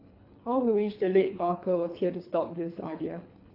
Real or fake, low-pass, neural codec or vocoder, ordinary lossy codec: fake; 5.4 kHz; codec, 24 kHz, 3 kbps, HILCodec; AAC, 32 kbps